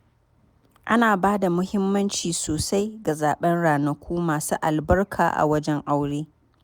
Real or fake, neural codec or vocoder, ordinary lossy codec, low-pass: real; none; none; none